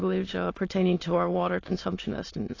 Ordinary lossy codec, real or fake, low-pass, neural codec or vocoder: AAC, 32 kbps; fake; 7.2 kHz; autoencoder, 22.05 kHz, a latent of 192 numbers a frame, VITS, trained on many speakers